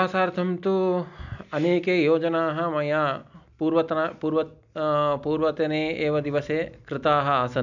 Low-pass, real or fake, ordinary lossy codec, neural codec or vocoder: 7.2 kHz; real; none; none